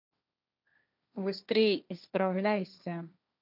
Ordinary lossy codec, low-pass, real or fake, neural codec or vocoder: none; 5.4 kHz; fake; codec, 16 kHz, 1.1 kbps, Voila-Tokenizer